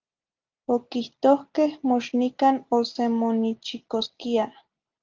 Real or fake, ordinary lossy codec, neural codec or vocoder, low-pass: real; Opus, 24 kbps; none; 7.2 kHz